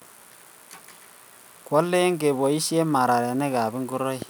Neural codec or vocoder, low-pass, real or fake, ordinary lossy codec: none; none; real; none